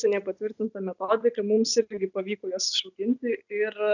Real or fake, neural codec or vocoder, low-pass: real; none; 7.2 kHz